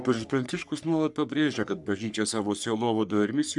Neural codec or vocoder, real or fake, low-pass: codec, 44.1 kHz, 3.4 kbps, Pupu-Codec; fake; 10.8 kHz